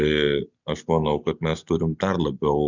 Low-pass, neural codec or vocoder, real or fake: 7.2 kHz; none; real